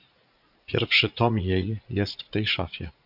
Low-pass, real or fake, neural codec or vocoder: 5.4 kHz; fake; vocoder, 44.1 kHz, 80 mel bands, Vocos